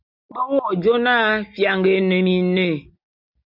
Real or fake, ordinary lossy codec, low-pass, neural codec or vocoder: real; MP3, 48 kbps; 5.4 kHz; none